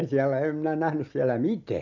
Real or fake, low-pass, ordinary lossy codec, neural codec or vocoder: real; 7.2 kHz; none; none